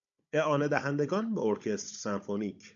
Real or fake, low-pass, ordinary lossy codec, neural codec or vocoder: fake; 7.2 kHz; AAC, 64 kbps; codec, 16 kHz, 16 kbps, FunCodec, trained on Chinese and English, 50 frames a second